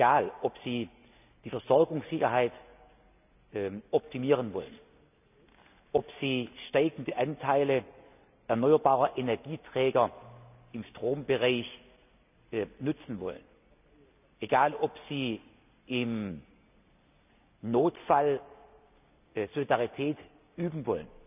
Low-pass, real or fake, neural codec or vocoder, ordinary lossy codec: 3.6 kHz; real; none; none